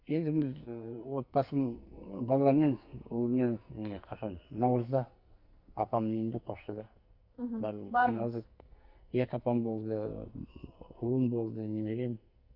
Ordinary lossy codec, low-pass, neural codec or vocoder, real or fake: Opus, 64 kbps; 5.4 kHz; codec, 44.1 kHz, 2.6 kbps, SNAC; fake